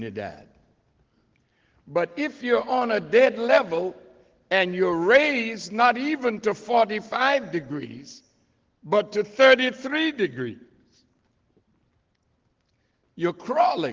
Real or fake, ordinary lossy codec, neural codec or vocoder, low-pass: real; Opus, 16 kbps; none; 7.2 kHz